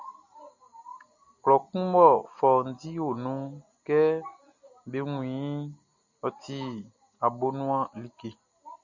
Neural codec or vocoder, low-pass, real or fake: none; 7.2 kHz; real